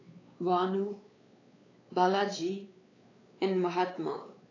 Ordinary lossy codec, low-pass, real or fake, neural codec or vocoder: AAC, 32 kbps; 7.2 kHz; fake; codec, 16 kHz, 4 kbps, X-Codec, WavLM features, trained on Multilingual LibriSpeech